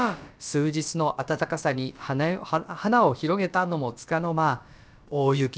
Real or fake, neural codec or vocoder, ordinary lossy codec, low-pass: fake; codec, 16 kHz, about 1 kbps, DyCAST, with the encoder's durations; none; none